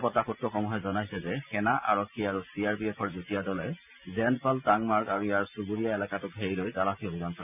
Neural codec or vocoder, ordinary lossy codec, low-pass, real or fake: none; none; 3.6 kHz; real